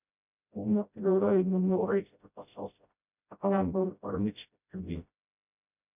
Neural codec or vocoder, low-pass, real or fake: codec, 16 kHz, 0.5 kbps, FreqCodec, smaller model; 3.6 kHz; fake